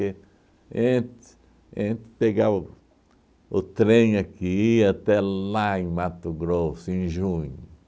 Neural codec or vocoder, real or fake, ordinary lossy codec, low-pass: none; real; none; none